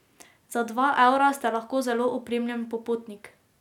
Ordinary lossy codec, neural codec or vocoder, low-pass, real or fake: none; none; 19.8 kHz; real